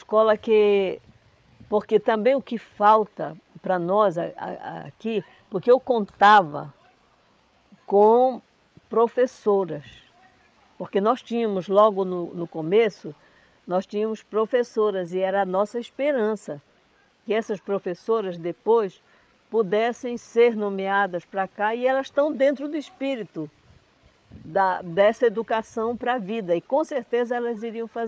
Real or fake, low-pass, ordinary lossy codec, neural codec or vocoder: fake; none; none; codec, 16 kHz, 8 kbps, FreqCodec, larger model